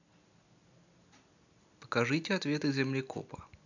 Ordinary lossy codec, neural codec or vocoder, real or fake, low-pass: none; none; real; 7.2 kHz